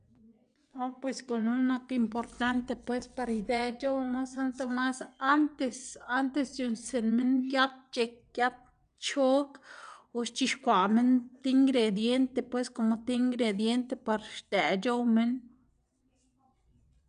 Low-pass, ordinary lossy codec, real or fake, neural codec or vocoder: 9.9 kHz; none; fake; vocoder, 22.05 kHz, 80 mel bands, WaveNeXt